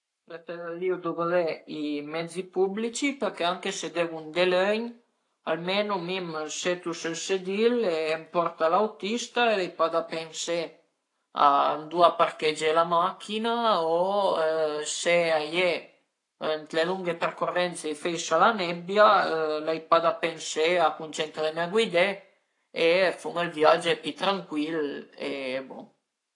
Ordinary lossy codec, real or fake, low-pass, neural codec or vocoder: AAC, 48 kbps; fake; 10.8 kHz; codec, 44.1 kHz, 7.8 kbps, Pupu-Codec